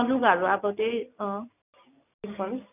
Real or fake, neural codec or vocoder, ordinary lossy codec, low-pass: real; none; none; 3.6 kHz